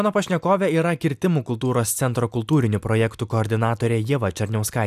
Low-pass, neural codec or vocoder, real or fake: 14.4 kHz; none; real